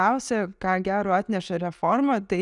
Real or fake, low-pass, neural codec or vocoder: real; 10.8 kHz; none